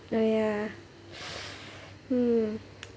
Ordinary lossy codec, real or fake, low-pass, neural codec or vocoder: none; real; none; none